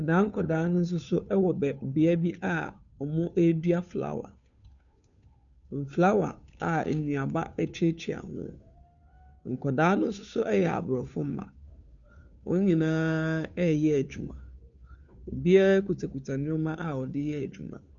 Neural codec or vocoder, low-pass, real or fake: codec, 16 kHz, 2 kbps, FunCodec, trained on Chinese and English, 25 frames a second; 7.2 kHz; fake